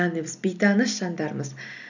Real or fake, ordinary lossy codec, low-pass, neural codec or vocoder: real; none; 7.2 kHz; none